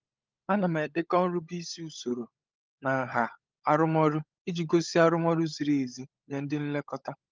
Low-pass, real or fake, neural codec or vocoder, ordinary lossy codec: 7.2 kHz; fake; codec, 16 kHz, 16 kbps, FunCodec, trained on LibriTTS, 50 frames a second; Opus, 24 kbps